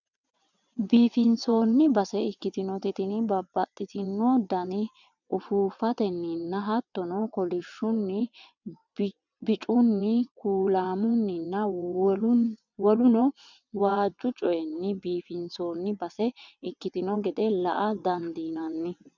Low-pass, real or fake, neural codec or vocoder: 7.2 kHz; fake; vocoder, 22.05 kHz, 80 mel bands, WaveNeXt